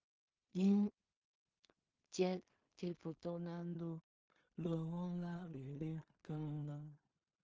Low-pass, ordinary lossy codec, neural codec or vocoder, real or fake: 7.2 kHz; Opus, 24 kbps; codec, 16 kHz in and 24 kHz out, 0.4 kbps, LongCat-Audio-Codec, two codebook decoder; fake